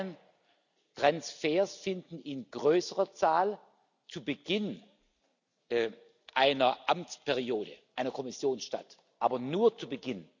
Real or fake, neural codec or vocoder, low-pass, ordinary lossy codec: real; none; 7.2 kHz; none